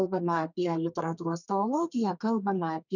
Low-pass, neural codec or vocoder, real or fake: 7.2 kHz; codec, 32 kHz, 1.9 kbps, SNAC; fake